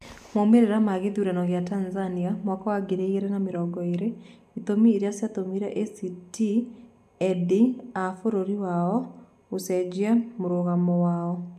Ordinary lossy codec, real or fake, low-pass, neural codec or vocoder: AAC, 96 kbps; real; 14.4 kHz; none